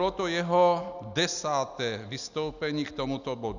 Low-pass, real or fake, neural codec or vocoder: 7.2 kHz; real; none